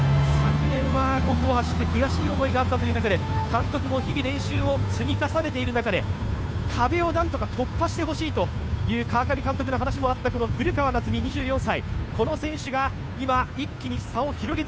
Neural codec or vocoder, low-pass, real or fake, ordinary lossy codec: codec, 16 kHz, 2 kbps, FunCodec, trained on Chinese and English, 25 frames a second; none; fake; none